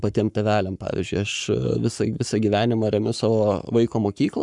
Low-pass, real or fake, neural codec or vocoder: 10.8 kHz; fake; codec, 44.1 kHz, 7.8 kbps, Pupu-Codec